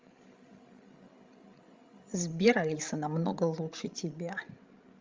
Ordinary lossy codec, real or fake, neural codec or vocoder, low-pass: Opus, 32 kbps; fake; codec, 16 kHz, 16 kbps, FreqCodec, larger model; 7.2 kHz